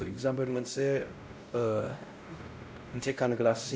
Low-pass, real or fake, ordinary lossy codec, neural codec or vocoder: none; fake; none; codec, 16 kHz, 0.5 kbps, X-Codec, WavLM features, trained on Multilingual LibriSpeech